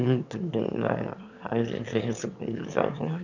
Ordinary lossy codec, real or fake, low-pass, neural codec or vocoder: none; fake; 7.2 kHz; autoencoder, 22.05 kHz, a latent of 192 numbers a frame, VITS, trained on one speaker